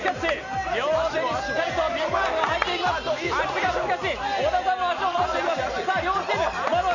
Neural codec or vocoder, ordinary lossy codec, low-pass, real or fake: none; none; 7.2 kHz; real